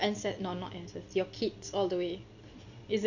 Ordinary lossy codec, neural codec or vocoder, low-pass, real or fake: none; none; 7.2 kHz; real